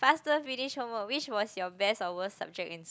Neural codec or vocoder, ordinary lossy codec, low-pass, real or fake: none; none; none; real